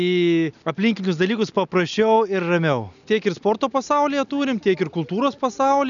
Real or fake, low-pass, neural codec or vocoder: real; 7.2 kHz; none